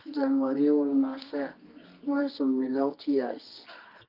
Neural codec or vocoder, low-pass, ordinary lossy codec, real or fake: codec, 24 kHz, 0.9 kbps, WavTokenizer, medium music audio release; 5.4 kHz; Opus, 32 kbps; fake